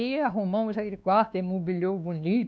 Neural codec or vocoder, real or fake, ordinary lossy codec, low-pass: codec, 16 kHz, 2 kbps, X-Codec, WavLM features, trained on Multilingual LibriSpeech; fake; none; none